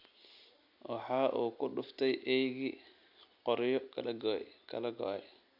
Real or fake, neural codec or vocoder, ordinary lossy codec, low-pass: real; none; none; 5.4 kHz